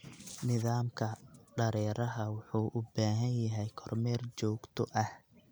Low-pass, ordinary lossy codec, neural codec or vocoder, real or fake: none; none; none; real